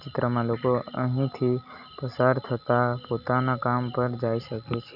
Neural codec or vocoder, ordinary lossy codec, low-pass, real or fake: none; none; 5.4 kHz; real